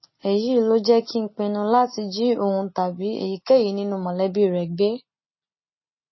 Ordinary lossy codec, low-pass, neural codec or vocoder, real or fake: MP3, 24 kbps; 7.2 kHz; none; real